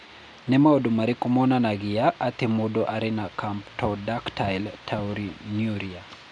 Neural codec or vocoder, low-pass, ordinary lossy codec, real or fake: none; 9.9 kHz; none; real